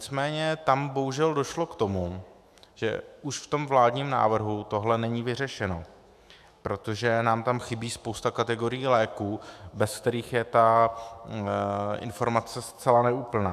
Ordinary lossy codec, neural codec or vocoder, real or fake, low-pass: AAC, 96 kbps; autoencoder, 48 kHz, 128 numbers a frame, DAC-VAE, trained on Japanese speech; fake; 14.4 kHz